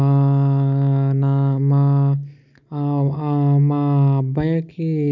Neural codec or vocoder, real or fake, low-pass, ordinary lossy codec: none; real; 7.2 kHz; none